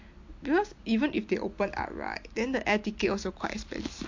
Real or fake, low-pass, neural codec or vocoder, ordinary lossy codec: real; 7.2 kHz; none; MP3, 48 kbps